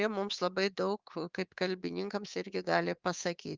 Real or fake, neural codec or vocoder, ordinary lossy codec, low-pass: fake; codec, 24 kHz, 3.1 kbps, DualCodec; Opus, 32 kbps; 7.2 kHz